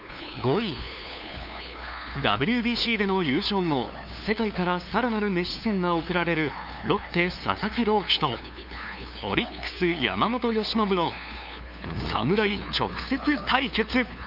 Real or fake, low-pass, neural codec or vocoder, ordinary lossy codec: fake; 5.4 kHz; codec, 16 kHz, 2 kbps, FunCodec, trained on LibriTTS, 25 frames a second; none